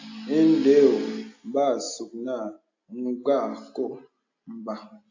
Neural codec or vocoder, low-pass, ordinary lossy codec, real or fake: none; 7.2 kHz; AAC, 48 kbps; real